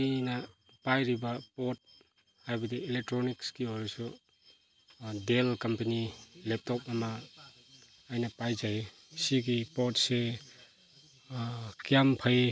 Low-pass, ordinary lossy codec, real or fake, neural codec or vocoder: none; none; real; none